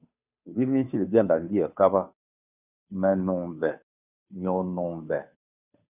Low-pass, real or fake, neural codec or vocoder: 3.6 kHz; fake; codec, 16 kHz, 2 kbps, FunCodec, trained on Chinese and English, 25 frames a second